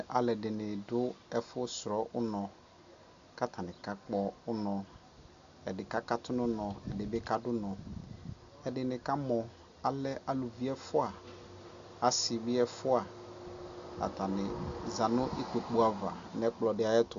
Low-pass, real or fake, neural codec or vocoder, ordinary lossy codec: 7.2 kHz; real; none; Opus, 64 kbps